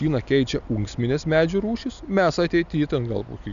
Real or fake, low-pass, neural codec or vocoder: real; 7.2 kHz; none